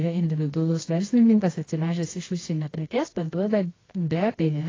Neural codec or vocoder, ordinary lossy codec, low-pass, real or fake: codec, 24 kHz, 0.9 kbps, WavTokenizer, medium music audio release; AAC, 32 kbps; 7.2 kHz; fake